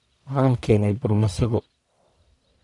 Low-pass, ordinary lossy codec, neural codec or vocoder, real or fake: 10.8 kHz; none; codec, 44.1 kHz, 1.7 kbps, Pupu-Codec; fake